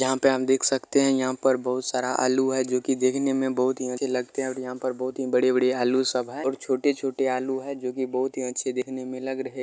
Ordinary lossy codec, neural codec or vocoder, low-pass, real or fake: none; none; none; real